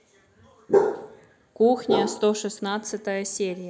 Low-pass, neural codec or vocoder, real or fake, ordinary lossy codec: none; none; real; none